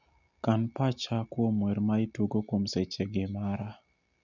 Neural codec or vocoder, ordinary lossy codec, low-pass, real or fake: none; none; 7.2 kHz; real